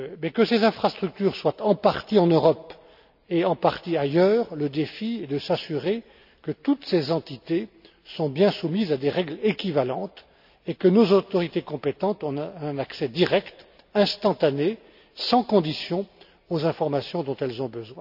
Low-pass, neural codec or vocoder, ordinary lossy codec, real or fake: 5.4 kHz; none; none; real